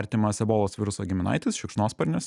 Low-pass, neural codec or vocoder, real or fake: 10.8 kHz; none; real